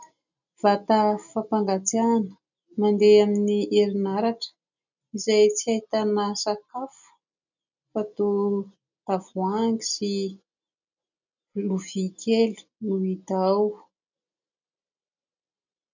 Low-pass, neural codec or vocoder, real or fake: 7.2 kHz; none; real